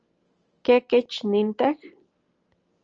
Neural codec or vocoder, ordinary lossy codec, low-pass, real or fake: none; Opus, 24 kbps; 7.2 kHz; real